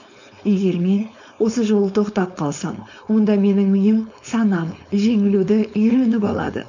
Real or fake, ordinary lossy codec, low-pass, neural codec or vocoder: fake; none; 7.2 kHz; codec, 16 kHz, 4.8 kbps, FACodec